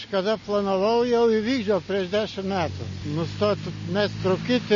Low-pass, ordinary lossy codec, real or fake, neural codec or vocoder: 7.2 kHz; MP3, 32 kbps; real; none